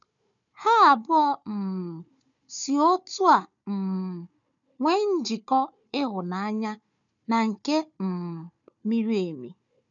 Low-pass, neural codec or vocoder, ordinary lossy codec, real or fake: 7.2 kHz; codec, 16 kHz, 4 kbps, FunCodec, trained on Chinese and English, 50 frames a second; none; fake